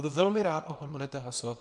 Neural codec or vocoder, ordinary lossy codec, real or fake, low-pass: codec, 24 kHz, 0.9 kbps, WavTokenizer, small release; MP3, 96 kbps; fake; 10.8 kHz